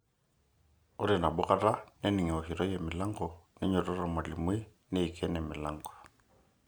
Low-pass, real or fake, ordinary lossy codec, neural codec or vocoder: none; real; none; none